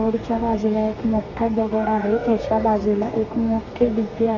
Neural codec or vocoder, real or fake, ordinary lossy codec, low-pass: codec, 32 kHz, 1.9 kbps, SNAC; fake; Opus, 64 kbps; 7.2 kHz